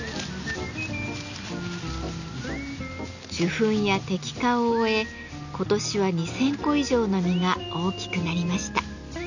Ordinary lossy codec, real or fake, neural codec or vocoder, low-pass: none; real; none; 7.2 kHz